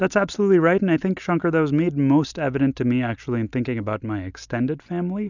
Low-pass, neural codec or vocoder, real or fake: 7.2 kHz; none; real